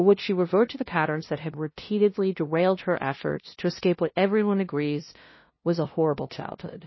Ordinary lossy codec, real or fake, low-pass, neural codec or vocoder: MP3, 24 kbps; fake; 7.2 kHz; codec, 16 kHz, 0.5 kbps, FunCodec, trained on LibriTTS, 25 frames a second